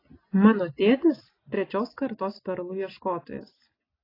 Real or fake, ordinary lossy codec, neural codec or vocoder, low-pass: real; AAC, 24 kbps; none; 5.4 kHz